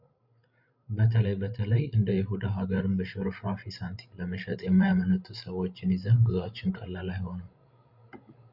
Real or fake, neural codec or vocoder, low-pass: fake; codec, 16 kHz, 16 kbps, FreqCodec, larger model; 5.4 kHz